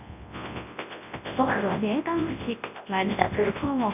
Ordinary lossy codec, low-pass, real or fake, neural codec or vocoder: none; 3.6 kHz; fake; codec, 24 kHz, 0.9 kbps, WavTokenizer, large speech release